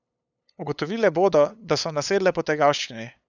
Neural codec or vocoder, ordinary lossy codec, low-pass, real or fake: codec, 16 kHz, 8 kbps, FunCodec, trained on LibriTTS, 25 frames a second; none; 7.2 kHz; fake